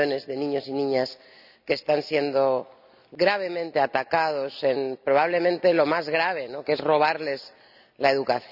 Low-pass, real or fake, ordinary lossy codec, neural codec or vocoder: 5.4 kHz; real; none; none